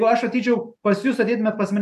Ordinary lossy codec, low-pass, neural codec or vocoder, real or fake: AAC, 96 kbps; 14.4 kHz; none; real